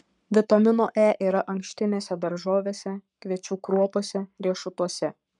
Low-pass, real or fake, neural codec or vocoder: 10.8 kHz; fake; codec, 44.1 kHz, 7.8 kbps, Pupu-Codec